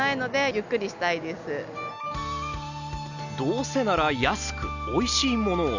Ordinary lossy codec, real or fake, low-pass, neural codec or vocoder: none; real; 7.2 kHz; none